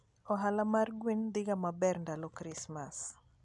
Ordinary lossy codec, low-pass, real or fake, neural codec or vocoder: none; 10.8 kHz; real; none